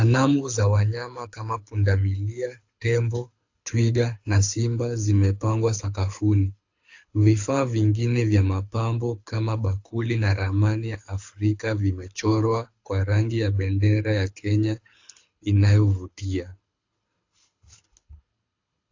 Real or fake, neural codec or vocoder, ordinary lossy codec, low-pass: fake; codec, 24 kHz, 6 kbps, HILCodec; AAC, 48 kbps; 7.2 kHz